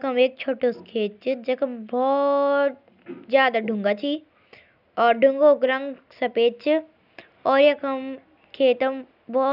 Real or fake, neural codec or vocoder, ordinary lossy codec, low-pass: real; none; none; 5.4 kHz